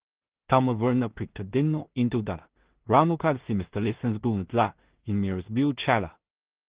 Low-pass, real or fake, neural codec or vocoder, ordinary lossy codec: 3.6 kHz; fake; codec, 16 kHz in and 24 kHz out, 0.4 kbps, LongCat-Audio-Codec, two codebook decoder; Opus, 16 kbps